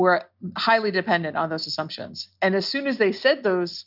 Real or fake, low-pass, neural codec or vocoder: real; 5.4 kHz; none